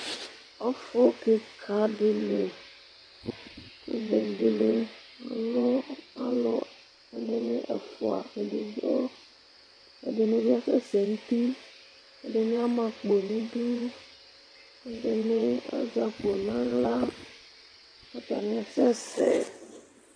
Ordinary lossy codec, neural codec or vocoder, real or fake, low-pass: AAC, 64 kbps; vocoder, 22.05 kHz, 80 mel bands, WaveNeXt; fake; 9.9 kHz